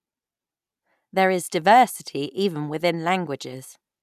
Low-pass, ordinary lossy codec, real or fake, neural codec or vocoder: 14.4 kHz; none; real; none